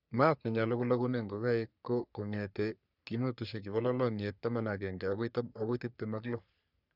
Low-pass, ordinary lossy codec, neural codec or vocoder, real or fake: 5.4 kHz; none; codec, 44.1 kHz, 3.4 kbps, Pupu-Codec; fake